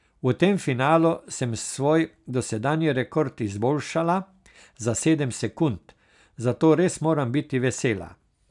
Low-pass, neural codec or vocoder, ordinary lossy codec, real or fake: 10.8 kHz; none; none; real